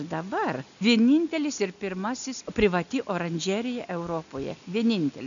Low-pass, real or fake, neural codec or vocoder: 7.2 kHz; real; none